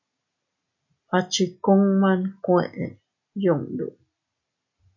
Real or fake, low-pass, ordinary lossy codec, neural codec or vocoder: real; 7.2 kHz; MP3, 64 kbps; none